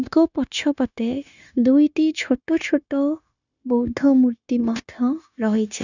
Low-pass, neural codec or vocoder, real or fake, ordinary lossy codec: 7.2 kHz; codec, 16 kHz, 0.9 kbps, LongCat-Audio-Codec; fake; none